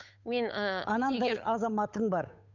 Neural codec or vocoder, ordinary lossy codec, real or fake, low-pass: codec, 16 kHz, 8 kbps, FunCodec, trained on Chinese and English, 25 frames a second; none; fake; 7.2 kHz